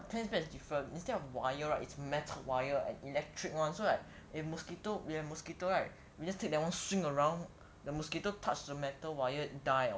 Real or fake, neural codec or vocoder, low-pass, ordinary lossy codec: real; none; none; none